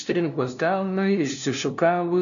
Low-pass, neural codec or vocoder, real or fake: 7.2 kHz; codec, 16 kHz, 0.5 kbps, FunCodec, trained on LibriTTS, 25 frames a second; fake